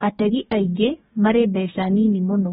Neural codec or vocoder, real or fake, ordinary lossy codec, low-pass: codec, 44.1 kHz, 2.6 kbps, DAC; fake; AAC, 16 kbps; 19.8 kHz